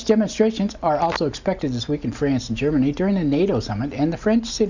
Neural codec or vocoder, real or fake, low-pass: none; real; 7.2 kHz